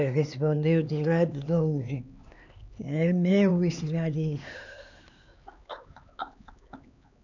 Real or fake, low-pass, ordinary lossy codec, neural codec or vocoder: fake; 7.2 kHz; none; codec, 16 kHz, 4 kbps, X-Codec, HuBERT features, trained on LibriSpeech